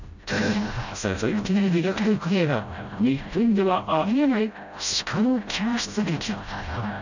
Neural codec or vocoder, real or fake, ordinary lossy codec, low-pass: codec, 16 kHz, 0.5 kbps, FreqCodec, smaller model; fake; none; 7.2 kHz